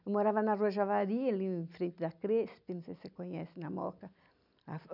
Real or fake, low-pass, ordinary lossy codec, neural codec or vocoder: real; 5.4 kHz; none; none